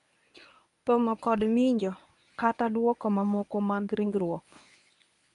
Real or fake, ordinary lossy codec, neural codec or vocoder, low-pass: fake; none; codec, 24 kHz, 0.9 kbps, WavTokenizer, medium speech release version 1; 10.8 kHz